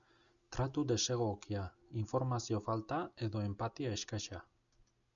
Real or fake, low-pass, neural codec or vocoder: real; 7.2 kHz; none